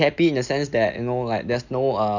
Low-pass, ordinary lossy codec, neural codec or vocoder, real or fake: 7.2 kHz; none; none; real